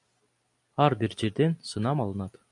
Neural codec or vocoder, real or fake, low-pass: none; real; 10.8 kHz